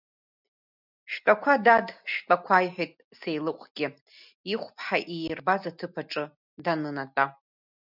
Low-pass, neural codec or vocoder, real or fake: 5.4 kHz; none; real